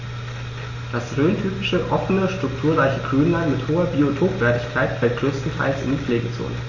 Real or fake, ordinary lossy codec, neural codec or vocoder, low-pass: real; MP3, 32 kbps; none; 7.2 kHz